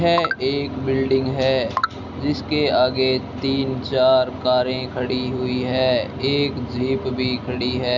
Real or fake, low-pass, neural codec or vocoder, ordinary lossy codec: real; 7.2 kHz; none; none